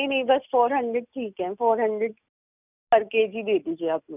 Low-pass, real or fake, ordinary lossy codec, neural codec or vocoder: 3.6 kHz; real; none; none